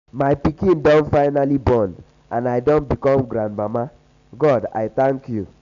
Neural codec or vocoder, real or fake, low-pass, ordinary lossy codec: none; real; 7.2 kHz; none